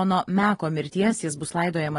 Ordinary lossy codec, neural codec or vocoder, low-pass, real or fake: AAC, 32 kbps; none; 10.8 kHz; real